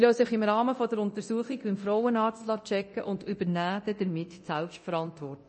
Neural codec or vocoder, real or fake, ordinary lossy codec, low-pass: codec, 24 kHz, 0.9 kbps, DualCodec; fake; MP3, 32 kbps; 10.8 kHz